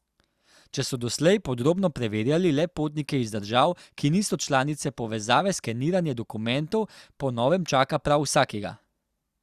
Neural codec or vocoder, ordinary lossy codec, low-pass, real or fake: none; Opus, 64 kbps; 14.4 kHz; real